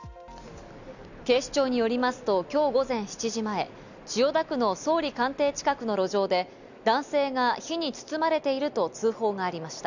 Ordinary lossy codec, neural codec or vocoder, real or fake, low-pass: none; none; real; 7.2 kHz